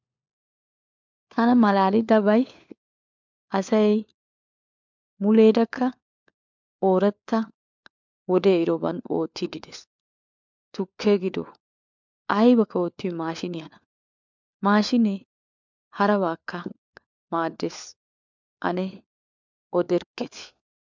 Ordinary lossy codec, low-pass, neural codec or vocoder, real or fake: MP3, 64 kbps; 7.2 kHz; codec, 16 kHz, 4 kbps, FunCodec, trained on LibriTTS, 50 frames a second; fake